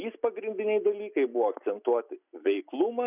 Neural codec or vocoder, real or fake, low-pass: none; real; 3.6 kHz